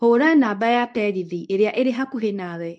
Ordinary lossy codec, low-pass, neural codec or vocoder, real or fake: none; none; codec, 24 kHz, 0.9 kbps, WavTokenizer, medium speech release version 2; fake